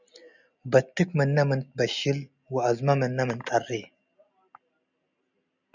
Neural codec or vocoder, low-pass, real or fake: none; 7.2 kHz; real